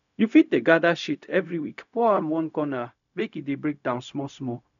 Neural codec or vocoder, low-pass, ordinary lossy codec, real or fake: codec, 16 kHz, 0.4 kbps, LongCat-Audio-Codec; 7.2 kHz; none; fake